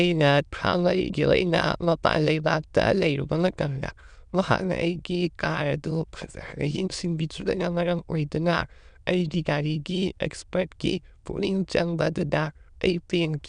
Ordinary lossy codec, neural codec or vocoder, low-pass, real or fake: none; autoencoder, 22.05 kHz, a latent of 192 numbers a frame, VITS, trained on many speakers; 9.9 kHz; fake